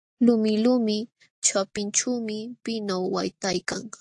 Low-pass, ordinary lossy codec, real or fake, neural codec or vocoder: 10.8 kHz; AAC, 64 kbps; real; none